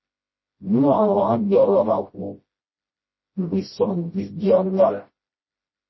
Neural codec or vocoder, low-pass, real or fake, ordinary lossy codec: codec, 16 kHz, 0.5 kbps, FreqCodec, smaller model; 7.2 kHz; fake; MP3, 24 kbps